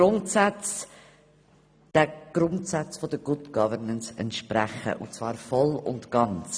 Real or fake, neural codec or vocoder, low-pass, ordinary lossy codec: real; none; none; none